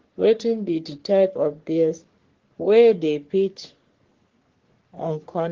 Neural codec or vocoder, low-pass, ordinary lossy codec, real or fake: codec, 44.1 kHz, 3.4 kbps, Pupu-Codec; 7.2 kHz; Opus, 16 kbps; fake